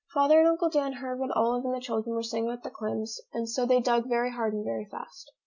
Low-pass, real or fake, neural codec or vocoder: 7.2 kHz; real; none